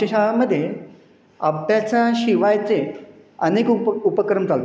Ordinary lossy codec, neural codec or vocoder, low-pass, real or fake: none; none; none; real